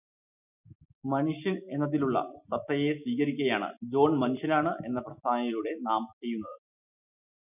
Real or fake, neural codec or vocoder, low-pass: real; none; 3.6 kHz